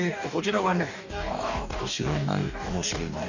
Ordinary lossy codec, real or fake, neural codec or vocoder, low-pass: none; fake; codec, 44.1 kHz, 2.6 kbps, DAC; 7.2 kHz